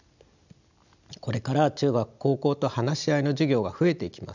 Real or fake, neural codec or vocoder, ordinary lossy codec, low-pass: real; none; none; 7.2 kHz